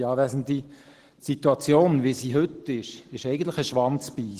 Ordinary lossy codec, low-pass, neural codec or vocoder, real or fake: Opus, 16 kbps; 14.4 kHz; none; real